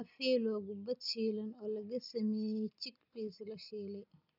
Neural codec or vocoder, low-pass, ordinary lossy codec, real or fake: none; 5.4 kHz; none; real